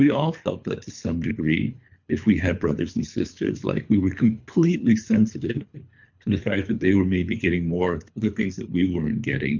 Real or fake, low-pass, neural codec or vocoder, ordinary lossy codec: fake; 7.2 kHz; codec, 24 kHz, 3 kbps, HILCodec; MP3, 64 kbps